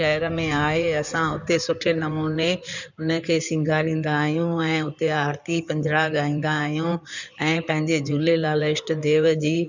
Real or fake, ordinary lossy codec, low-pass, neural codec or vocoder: fake; none; 7.2 kHz; codec, 16 kHz in and 24 kHz out, 2.2 kbps, FireRedTTS-2 codec